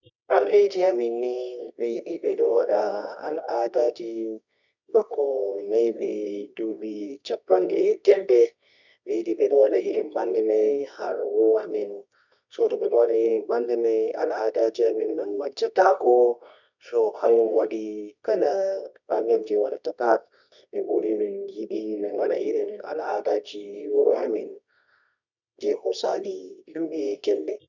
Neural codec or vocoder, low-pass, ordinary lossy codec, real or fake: codec, 24 kHz, 0.9 kbps, WavTokenizer, medium music audio release; 7.2 kHz; none; fake